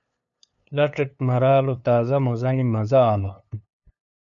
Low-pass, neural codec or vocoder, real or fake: 7.2 kHz; codec, 16 kHz, 2 kbps, FunCodec, trained on LibriTTS, 25 frames a second; fake